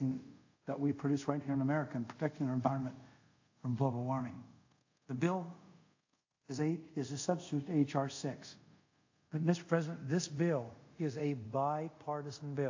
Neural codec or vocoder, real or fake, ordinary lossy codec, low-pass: codec, 24 kHz, 0.5 kbps, DualCodec; fake; AAC, 48 kbps; 7.2 kHz